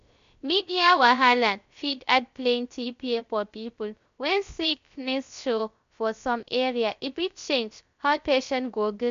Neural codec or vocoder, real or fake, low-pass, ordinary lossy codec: codec, 16 kHz, 0.3 kbps, FocalCodec; fake; 7.2 kHz; MP3, 64 kbps